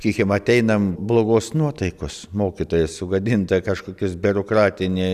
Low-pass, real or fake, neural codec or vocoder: 14.4 kHz; real; none